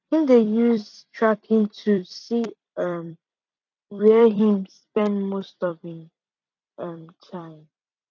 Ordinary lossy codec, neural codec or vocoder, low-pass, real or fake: none; vocoder, 44.1 kHz, 128 mel bands every 256 samples, BigVGAN v2; 7.2 kHz; fake